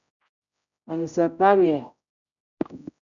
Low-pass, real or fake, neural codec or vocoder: 7.2 kHz; fake; codec, 16 kHz, 0.5 kbps, X-Codec, HuBERT features, trained on general audio